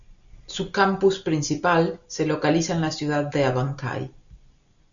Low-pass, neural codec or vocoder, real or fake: 7.2 kHz; none; real